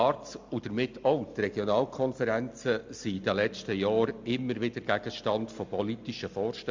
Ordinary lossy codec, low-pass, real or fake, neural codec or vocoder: MP3, 64 kbps; 7.2 kHz; real; none